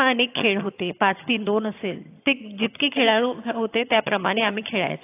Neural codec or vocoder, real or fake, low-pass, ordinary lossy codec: vocoder, 22.05 kHz, 80 mel bands, HiFi-GAN; fake; 3.6 kHz; AAC, 24 kbps